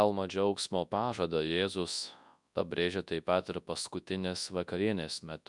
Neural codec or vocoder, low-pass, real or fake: codec, 24 kHz, 0.9 kbps, WavTokenizer, large speech release; 10.8 kHz; fake